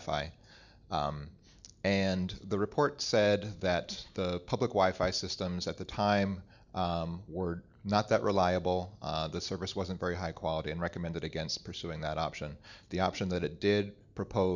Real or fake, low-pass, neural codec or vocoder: real; 7.2 kHz; none